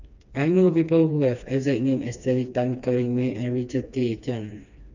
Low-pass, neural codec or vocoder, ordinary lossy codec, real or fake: 7.2 kHz; codec, 16 kHz, 2 kbps, FreqCodec, smaller model; none; fake